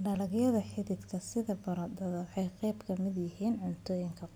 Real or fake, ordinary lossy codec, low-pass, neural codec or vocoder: real; none; none; none